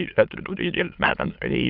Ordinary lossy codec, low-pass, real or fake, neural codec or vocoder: Opus, 24 kbps; 5.4 kHz; fake; autoencoder, 22.05 kHz, a latent of 192 numbers a frame, VITS, trained on many speakers